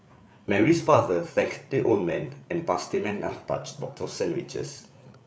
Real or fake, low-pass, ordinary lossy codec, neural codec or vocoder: fake; none; none; codec, 16 kHz, 8 kbps, FreqCodec, larger model